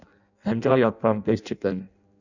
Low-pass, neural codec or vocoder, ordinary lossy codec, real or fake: 7.2 kHz; codec, 16 kHz in and 24 kHz out, 0.6 kbps, FireRedTTS-2 codec; none; fake